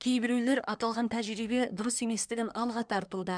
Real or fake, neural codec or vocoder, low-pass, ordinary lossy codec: fake; codec, 24 kHz, 1 kbps, SNAC; 9.9 kHz; none